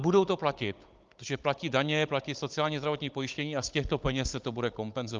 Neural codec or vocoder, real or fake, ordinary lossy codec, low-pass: codec, 16 kHz, 16 kbps, FunCodec, trained on Chinese and English, 50 frames a second; fake; Opus, 24 kbps; 7.2 kHz